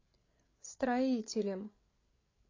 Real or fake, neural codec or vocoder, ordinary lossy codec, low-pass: fake; codec, 16 kHz, 8 kbps, FreqCodec, larger model; AAC, 32 kbps; 7.2 kHz